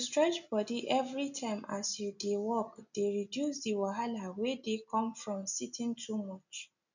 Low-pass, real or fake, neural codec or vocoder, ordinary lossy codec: 7.2 kHz; real; none; none